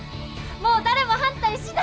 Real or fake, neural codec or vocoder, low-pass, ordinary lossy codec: real; none; none; none